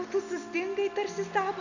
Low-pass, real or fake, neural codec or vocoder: 7.2 kHz; real; none